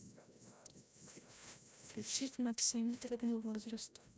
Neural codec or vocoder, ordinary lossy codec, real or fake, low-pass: codec, 16 kHz, 0.5 kbps, FreqCodec, larger model; none; fake; none